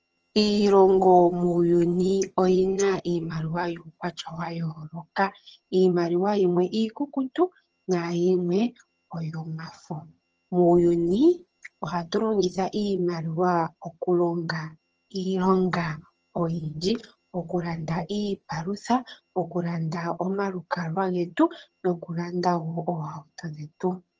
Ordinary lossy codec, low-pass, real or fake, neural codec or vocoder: Opus, 24 kbps; 7.2 kHz; fake; vocoder, 22.05 kHz, 80 mel bands, HiFi-GAN